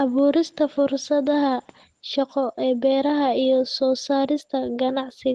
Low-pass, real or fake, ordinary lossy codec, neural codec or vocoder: 10.8 kHz; real; Opus, 16 kbps; none